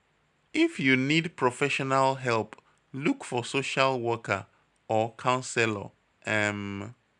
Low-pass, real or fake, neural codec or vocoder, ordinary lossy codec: 10.8 kHz; real; none; none